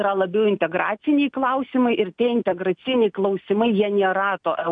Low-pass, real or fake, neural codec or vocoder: 9.9 kHz; real; none